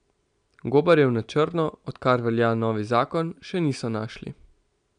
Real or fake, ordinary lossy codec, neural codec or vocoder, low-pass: real; none; none; 9.9 kHz